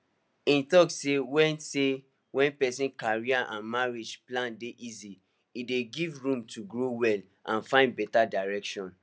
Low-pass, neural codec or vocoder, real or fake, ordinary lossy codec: none; none; real; none